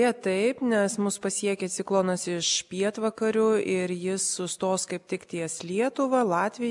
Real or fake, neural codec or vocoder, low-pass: real; none; 10.8 kHz